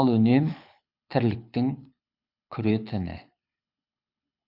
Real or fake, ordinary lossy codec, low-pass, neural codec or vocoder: fake; none; 5.4 kHz; codec, 24 kHz, 6 kbps, HILCodec